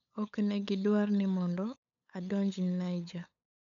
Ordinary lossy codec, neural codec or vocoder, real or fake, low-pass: none; codec, 16 kHz, 8 kbps, FunCodec, trained on LibriTTS, 25 frames a second; fake; 7.2 kHz